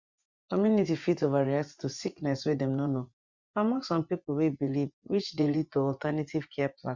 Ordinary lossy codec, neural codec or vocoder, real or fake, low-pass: Opus, 64 kbps; vocoder, 24 kHz, 100 mel bands, Vocos; fake; 7.2 kHz